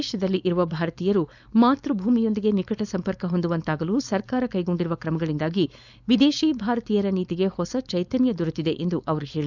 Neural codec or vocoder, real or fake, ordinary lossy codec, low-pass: codec, 16 kHz, 4.8 kbps, FACodec; fake; none; 7.2 kHz